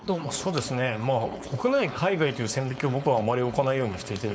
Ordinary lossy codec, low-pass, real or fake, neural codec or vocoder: none; none; fake; codec, 16 kHz, 4.8 kbps, FACodec